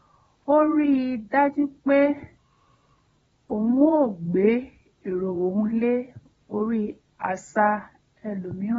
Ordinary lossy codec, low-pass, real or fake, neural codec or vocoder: AAC, 24 kbps; 9.9 kHz; fake; vocoder, 22.05 kHz, 80 mel bands, Vocos